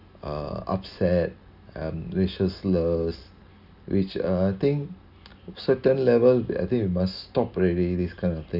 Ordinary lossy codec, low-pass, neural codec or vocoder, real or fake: none; 5.4 kHz; none; real